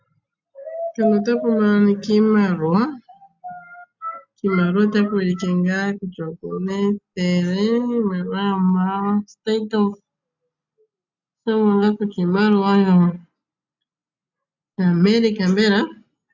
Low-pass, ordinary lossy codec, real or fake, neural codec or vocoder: 7.2 kHz; MP3, 64 kbps; real; none